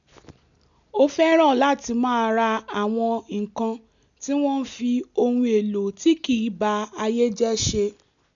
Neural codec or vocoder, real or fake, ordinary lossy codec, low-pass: none; real; none; 7.2 kHz